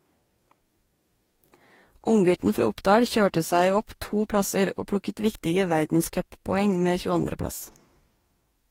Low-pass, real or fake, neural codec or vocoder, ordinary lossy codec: 19.8 kHz; fake; codec, 44.1 kHz, 2.6 kbps, DAC; AAC, 48 kbps